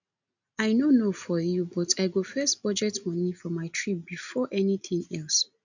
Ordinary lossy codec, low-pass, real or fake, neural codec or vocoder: none; 7.2 kHz; real; none